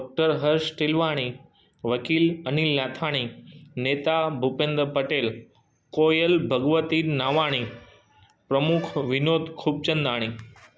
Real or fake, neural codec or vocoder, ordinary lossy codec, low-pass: real; none; none; none